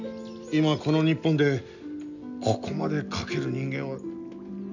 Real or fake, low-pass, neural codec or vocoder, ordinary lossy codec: real; 7.2 kHz; none; none